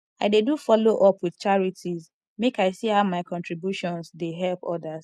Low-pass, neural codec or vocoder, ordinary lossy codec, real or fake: none; none; none; real